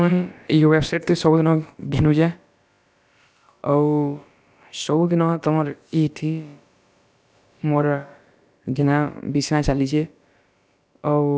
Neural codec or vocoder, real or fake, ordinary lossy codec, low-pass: codec, 16 kHz, about 1 kbps, DyCAST, with the encoder's durations; fake; none; none